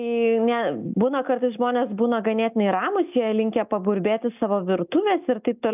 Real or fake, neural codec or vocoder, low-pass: real; none; 3.6 kHz